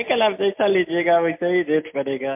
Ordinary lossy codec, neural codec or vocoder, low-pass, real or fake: MP3, 32 kbps; none; 3.6 kHz; real